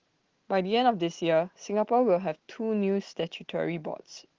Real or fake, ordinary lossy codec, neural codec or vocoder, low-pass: real; Opus, 16 kbps; none; 7.2 kHz